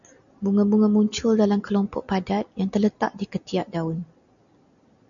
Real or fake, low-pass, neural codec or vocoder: real; 7.2 kHz; none